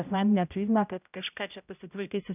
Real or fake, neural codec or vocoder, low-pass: fake; codec, 16 kHz, 0.5 kbps, X-Codec, HuBERT features, trained on general audio; 3.6 kHz